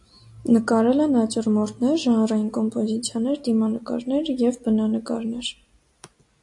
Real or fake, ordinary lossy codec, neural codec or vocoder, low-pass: real; AAC, 64 kbps; none; 10.8 kHz